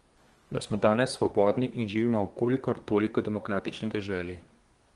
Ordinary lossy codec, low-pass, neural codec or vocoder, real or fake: Opus, 24 kbps; 10.8 kHz; codec, 24 kHz, 1 kbps, SNAC; fake